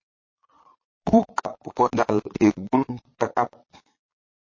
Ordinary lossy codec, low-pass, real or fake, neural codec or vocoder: MP3, 32 kbps; 7.2 kHz; fake; vocoder, 22.05 kHz, 80 mel bands, Vocos